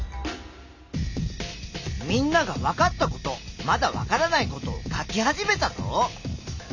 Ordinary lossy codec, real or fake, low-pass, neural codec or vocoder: none; real; 7.2 kHz; none